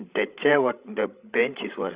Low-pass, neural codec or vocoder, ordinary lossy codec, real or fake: 3.6 kHz; codec, 16 kHz, 16 kbps, FreqCodec, larger model; Opus, 64 kbps; fake